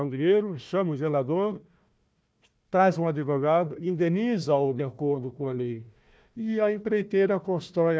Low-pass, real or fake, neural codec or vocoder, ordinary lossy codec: none; fake; codec, 16 kHz, 1 kbps, FunCodec, trained on Chinese and English, 50 frames a second; none